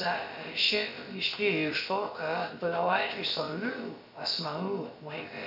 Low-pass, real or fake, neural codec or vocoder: 5.4 kHz; fake; codec, 16 kHz, about 1 kbps, DyCAST, with the encoder's durations